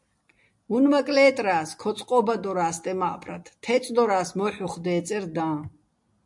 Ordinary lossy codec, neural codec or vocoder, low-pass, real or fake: MP3, 64 kbps; none; 10.8 kHz; real